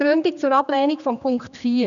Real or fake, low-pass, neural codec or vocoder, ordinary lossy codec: fake; 7.2 kHz; codec, 16 kHz, 2 kbps, X-Codec, HuBERT features, trained on general audio; none